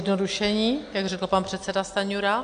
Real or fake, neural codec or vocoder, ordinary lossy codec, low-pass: real; none; AAC, 64 kbps; 9.9 kHz